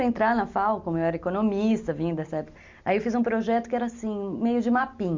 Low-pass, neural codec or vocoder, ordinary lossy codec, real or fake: 7.2 kHz; none; MP3, 64 kbps; real